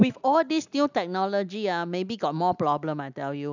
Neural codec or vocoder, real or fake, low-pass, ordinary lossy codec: none; real; 7.2 kHz; none